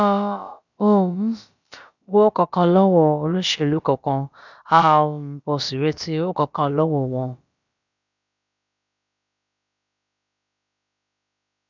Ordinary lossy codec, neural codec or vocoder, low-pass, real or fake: none; codec, 16 kHz, about 1 kbps, DyCAST, with the encoder's durations; 7.2 kHz; fake